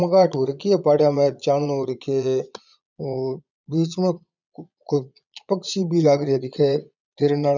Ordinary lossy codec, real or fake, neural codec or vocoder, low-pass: none; fake; vocoder, 22.05 kHz, 80 mel bands, Vocos; 7.2 kHz